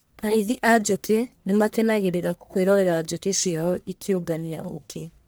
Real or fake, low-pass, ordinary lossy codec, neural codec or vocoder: fake; none; none; codec, 44.1 kHz, 1.7 kbps, Pupu-Codec